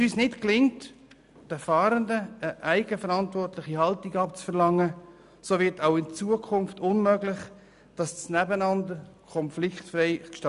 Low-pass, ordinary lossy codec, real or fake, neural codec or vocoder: 10.8 kHz; none; real; none